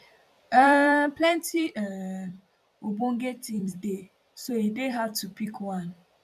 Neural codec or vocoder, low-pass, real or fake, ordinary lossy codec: vocoder, 44.1 kHz, 128 mel bands every 256 samples, BigVGAN v2; 14.4 kHz; fake; none